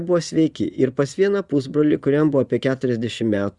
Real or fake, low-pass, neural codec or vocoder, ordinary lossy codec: fake; 10.8 kHz; vocoder, 24 kHz, 100 mel bands, Vocos; Opus, 64 kbps